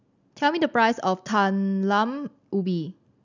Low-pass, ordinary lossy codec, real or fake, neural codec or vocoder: 7.2 kHz; none; real; none